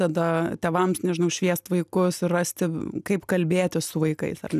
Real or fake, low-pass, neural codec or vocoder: real; 14.4 kHz; none